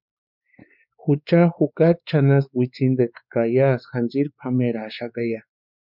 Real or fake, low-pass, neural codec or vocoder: fake; 5.4 kHz; codec, 16 kHz, 4 kbps, X-Codec, WavLM features, trained on Multilingual LibriSpeech